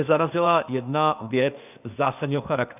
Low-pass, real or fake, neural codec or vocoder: 3.6 kHz; fake; codec, 16 kHz, 0.8 kbps, ZipCodec